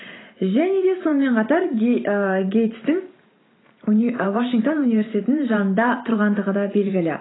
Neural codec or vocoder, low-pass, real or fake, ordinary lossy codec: vocoder, 44.1 kHz, 128 mel bands every 512 samples, BigVGAN v2; 7.2 kHz; fake; AAC, 16 kbps